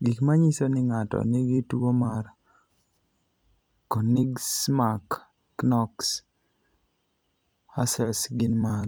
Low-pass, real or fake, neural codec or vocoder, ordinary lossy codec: none; fake; vocoder, 44.1 kHz, 128 mel bands every 256 samples, BigVGAN v2; none